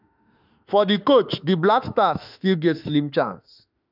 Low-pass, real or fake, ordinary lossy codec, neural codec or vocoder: 5.4 kHz; fake; none; autoencoder, 48 kHz, 32 numbers a frame, DAC-VAE, trained on Japanese speech